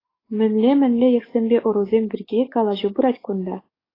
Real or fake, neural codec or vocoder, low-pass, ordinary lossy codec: fake; codec, 44.1 kHz, 7.8 kbps, DAC; 5.4 kHz; AAC, 24 kbps